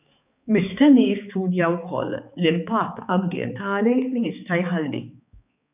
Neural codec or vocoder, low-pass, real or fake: codec, 16 kHz, 4 kbps, X-Codec, HuBERT features, trained on balanced general audio; 3.6 kHz; fake